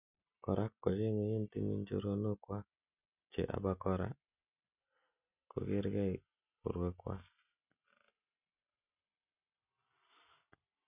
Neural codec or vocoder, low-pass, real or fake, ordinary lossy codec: none; 3.6 kHz; real; none